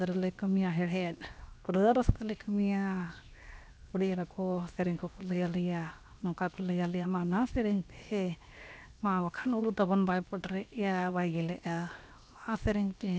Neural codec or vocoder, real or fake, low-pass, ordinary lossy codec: codec, 16 kHz, 0.7 kbps, FocalCodec; fake; none; none